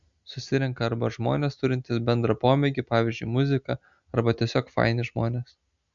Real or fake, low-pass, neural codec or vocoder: real; 7.2 kHz; none